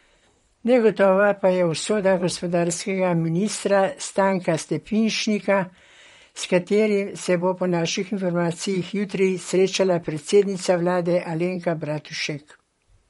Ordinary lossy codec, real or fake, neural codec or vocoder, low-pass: MP3, 48 kbps; fake; vocoder, 44.1 kHz, 128 mel bands, Pupu-Vocoder; 19.8 kHz